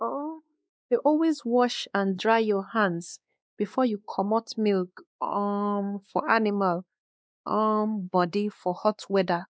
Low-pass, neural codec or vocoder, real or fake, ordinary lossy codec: none; codec, 16 kHz, 2 kbps, X-Codec, WavLM features, trained on Multilingual LibriSpeech; fake; none